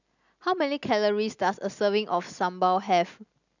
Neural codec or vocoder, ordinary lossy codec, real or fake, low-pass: none; none; real; 7.2 kHz